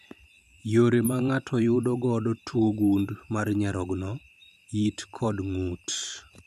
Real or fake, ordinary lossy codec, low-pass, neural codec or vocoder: fake; none; 14.4 kHz; vocoder, 44.1 kHz, 128 mel bands every 512 samples, BigVGAN v2